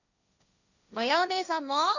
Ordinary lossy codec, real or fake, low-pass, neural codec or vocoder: none; fake; 7.2 kHz; codec, 16 kHz, 1.1 kbps, Voila-Tokenizer